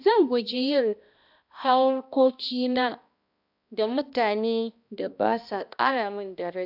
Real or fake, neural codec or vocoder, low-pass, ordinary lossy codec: fake; codec, 16 kHz, 1 kbps, X-Codec, HuBERT features, trained on balanced general audio; 5.4 kHz; AAC, 48 kbps